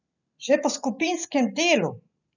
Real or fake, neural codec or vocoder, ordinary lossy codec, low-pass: real; none; none; 7.2 kHz